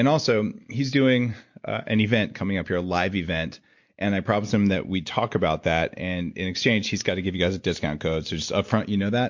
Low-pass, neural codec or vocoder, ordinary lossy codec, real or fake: 7.2 kHz; none; MP3, 48 kbps; real